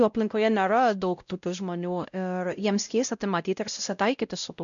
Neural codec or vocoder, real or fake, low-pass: codec, 16 kHz, 0.5 kbps, X-Codec, WavLM features, trained on Multilingual LibriSpeech; fake; 7.2 kHz